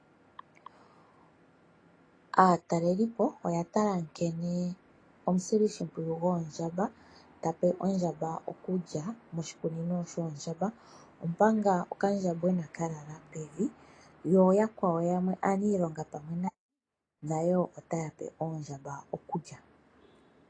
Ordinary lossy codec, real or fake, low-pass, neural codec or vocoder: AAC, 32 kbps; real; 9.9 kHz; none